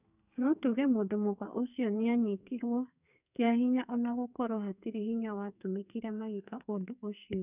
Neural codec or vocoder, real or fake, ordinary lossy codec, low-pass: codec, 44.1 kHz, 2.6 kbps, SNAC; fake; none; 3.6 kHz